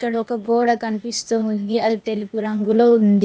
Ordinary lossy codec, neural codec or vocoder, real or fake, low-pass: none; codec, 16 kHz, 0.8 kbps, ZipCodec; fake; none